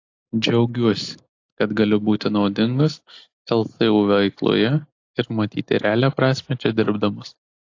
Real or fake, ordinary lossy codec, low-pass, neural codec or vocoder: real; AAC, 48 kbps; 7.2 kHz; none